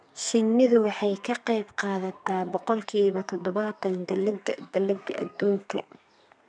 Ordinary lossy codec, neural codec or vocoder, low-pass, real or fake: none; codec, 32 kHz, 1.9 kbps, SNAC; 9.9 kHz; fake